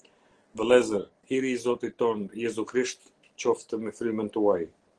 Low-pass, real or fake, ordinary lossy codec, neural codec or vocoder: 9.9 kHz; real; Opus, 16 kbps; none